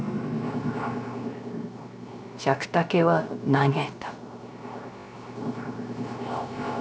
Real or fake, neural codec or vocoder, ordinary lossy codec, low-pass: fake; codec, 16 kHz, 0.3 kbps, FocalCodec; none; none